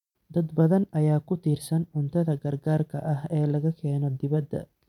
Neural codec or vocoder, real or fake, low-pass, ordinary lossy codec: vocoder, 44.1 kHz, 128 mel bands every 256 samples, BigVGAN v2; fake; 19.8 kHz; none